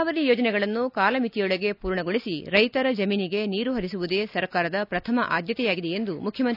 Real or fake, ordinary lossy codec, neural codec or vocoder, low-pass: real; none; none; 5.4 kHz